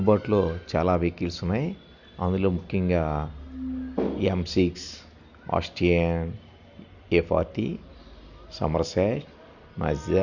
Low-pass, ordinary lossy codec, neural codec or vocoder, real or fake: 7.2 kHz; none; none; real